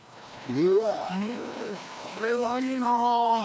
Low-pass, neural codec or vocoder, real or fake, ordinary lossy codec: none; codec, 16 kHz, 1 kbps, FreqCodec, larger model; fake; none